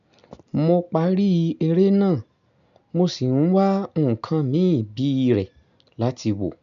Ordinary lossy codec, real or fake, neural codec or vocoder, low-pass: none; real; none; 7.2 kHz